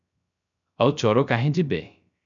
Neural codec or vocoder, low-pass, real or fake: codec, 16 kHz, 0.3 kbps, FocalCodec; 7.2 kHz; fake